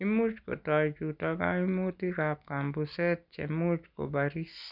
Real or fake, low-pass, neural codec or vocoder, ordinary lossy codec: real; 5.4 kHz; none; none